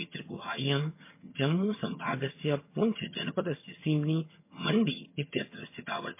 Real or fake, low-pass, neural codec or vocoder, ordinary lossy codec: fake; 3.6 kHz; vocoder, 22.05 kHz, 80 mel bands, HiFi-GAN; MP3, 24 kbps